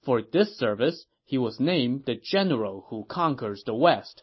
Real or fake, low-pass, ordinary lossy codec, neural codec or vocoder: real; 7.2 kHz; MP3, 24 kbps; none